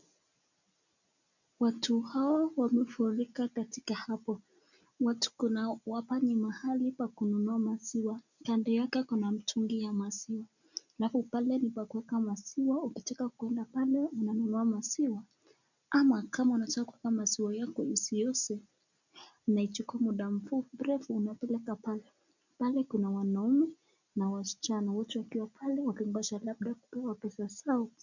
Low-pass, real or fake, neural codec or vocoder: 7.2 kHz; real; none